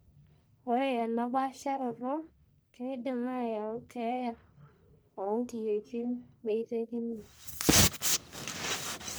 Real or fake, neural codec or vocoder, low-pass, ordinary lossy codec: fake; codec, 44.1 kHz, 1.7 kbps, Pupu-Codec; none; none